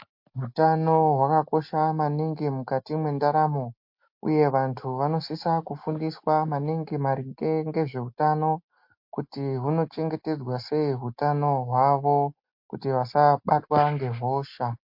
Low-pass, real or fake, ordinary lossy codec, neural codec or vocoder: 5.4 kHz; real; MP3, 32 kbps; none